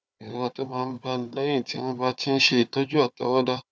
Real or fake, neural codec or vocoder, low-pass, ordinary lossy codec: fake; codec, 16 kHz, 4 kbps, FunCodec, trained on Chinese and English, 50 frames a second; none; none